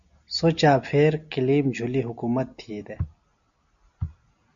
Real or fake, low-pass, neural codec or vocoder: real; 7.2 kHz; none